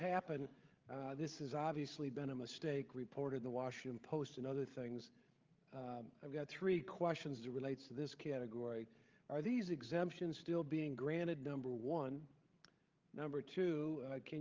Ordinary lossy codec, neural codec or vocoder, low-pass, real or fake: Opus, 32 kbps; codec, 16 kHz, 8 kbps, FreqCodec, larger model; 7.2 kHz; fake